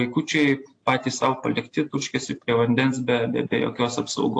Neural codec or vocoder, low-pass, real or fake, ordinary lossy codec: none; 9.9 kHz; real; AAC, 48 kbps